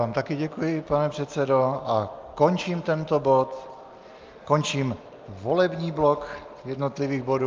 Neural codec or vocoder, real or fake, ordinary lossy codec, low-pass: none; real; Opus, 24 kbps; 7.2 kHz